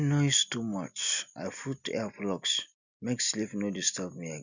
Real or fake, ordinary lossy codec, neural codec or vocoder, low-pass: real; none; none; 7.2 kHz